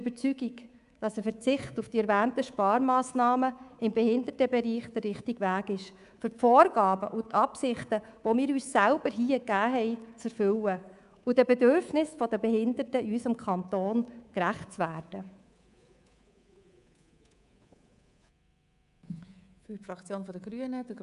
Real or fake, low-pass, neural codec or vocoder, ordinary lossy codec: fake; 10.8 kHz; codec, 24 kHz, 3.1 kbps, DualCodec; none